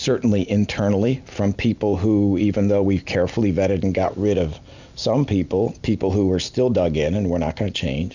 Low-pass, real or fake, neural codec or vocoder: 7.2 kHz; real; none